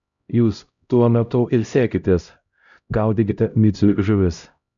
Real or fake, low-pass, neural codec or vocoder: fake; 7.2 kHz; codec, 16 kHz, 0.5 kbps, X-Codec, HuBERT features, trained on LibriSpeech